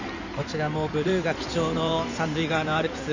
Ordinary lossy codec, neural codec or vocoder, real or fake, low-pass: none; codec, 16 kHz in and 24 kHz out, 2.2 kbps, FireRedTTS-2 codec; fake; 7.2 kHz